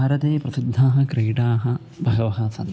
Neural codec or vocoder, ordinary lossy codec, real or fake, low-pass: none; none; real; none